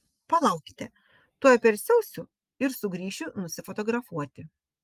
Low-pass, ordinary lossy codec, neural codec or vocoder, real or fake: 14.4 kHz; Opus, 32 kbps; none; real